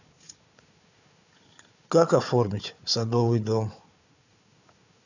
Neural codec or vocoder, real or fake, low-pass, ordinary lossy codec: codec, 16 kHz, 4 kbps, FunCodec, trained on Chinese and English, 50 frames a second; fake; 7.2 kHz; none